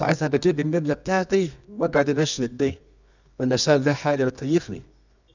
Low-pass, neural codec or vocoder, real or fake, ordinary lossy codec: 7.2 kHz; codec, 24 kHz, 0.9 kbps, WavTokenizer, medium music audio release; fake; none